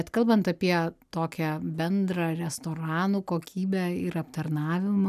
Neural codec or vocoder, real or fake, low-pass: none; real; 14.4 kHz